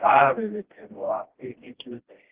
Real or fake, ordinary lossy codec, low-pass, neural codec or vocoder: fake; Opus, 16 kbps; 3.6 kHz; codec, 16 kHz, 1 kbps, FreqCodec, smaller model